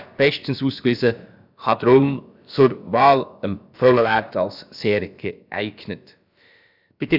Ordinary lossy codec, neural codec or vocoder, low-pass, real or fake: none; codec, 16 kHz, about 1 kbps, DyCAST, with the encoder's durations; 5.4 kHz; fake